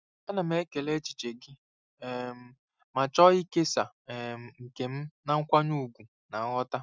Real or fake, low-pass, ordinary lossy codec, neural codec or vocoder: real; none; none; none